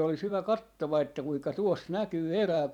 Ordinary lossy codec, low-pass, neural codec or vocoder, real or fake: none; 19.8 kHz; vocoder, 44.1 kHz, 128 mel bands every 512 samples, BigVGAN v2; fake